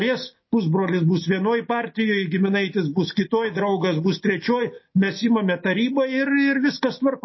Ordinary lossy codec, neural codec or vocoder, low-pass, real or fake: MP3, 24 kbps; none; 7.2 kHz; real